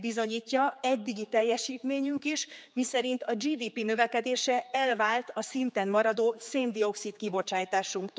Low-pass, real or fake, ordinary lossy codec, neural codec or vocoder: none; fake; none; codec, 16 kHz, 4 kbps, X-Codec, HuBERT features, trained on general audio